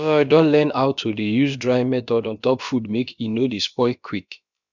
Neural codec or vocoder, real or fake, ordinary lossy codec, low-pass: codec, 16 kHz, about 1 kbps, DyCAST, with the encoder's durations; fake; none; 7.2 kHz